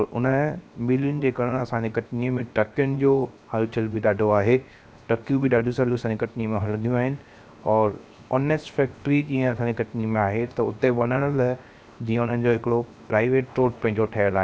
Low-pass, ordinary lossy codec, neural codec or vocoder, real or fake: none; none; codec, 16 kHz, 0.7 kbps, FocalCodec; fake